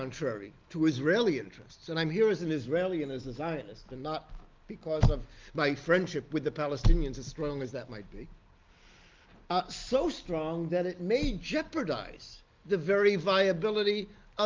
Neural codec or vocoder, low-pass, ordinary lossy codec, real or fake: none; 7.2 kHz; Opus, 32 kbps; real